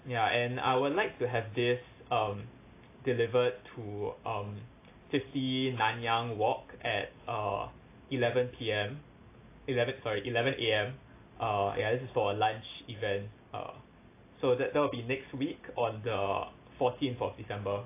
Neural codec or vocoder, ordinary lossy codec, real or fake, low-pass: none; AAC, 24 kbps; real; 3.6 kHz